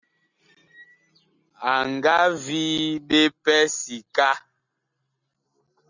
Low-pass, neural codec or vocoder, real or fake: 7.2 kHz; none; real